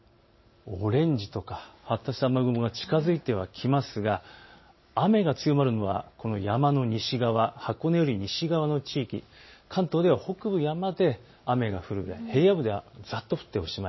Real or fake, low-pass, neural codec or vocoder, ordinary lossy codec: real; 7.2 kHz; none; MP3, 24 kbps